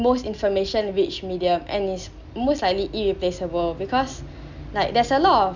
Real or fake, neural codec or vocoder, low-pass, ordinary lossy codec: real; none; 7.2 kHz; none